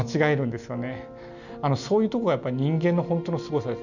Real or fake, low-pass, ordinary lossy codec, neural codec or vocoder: real; 7.2 kHz; none; none